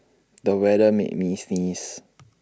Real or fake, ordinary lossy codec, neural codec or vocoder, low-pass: real; none; none; none